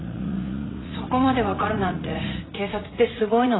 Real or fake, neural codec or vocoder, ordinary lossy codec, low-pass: fake; vocoder, 44.1 kHz, 80 mel bands, Vocos; AAC, 16 kbps; 7.2 kHz